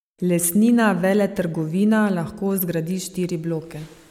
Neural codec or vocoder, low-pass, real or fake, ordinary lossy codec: none; 19.8 kHz; real; MP3, 96 kbps